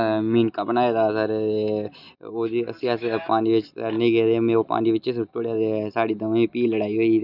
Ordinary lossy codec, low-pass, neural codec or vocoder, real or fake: none; 5.4 kHz; none; real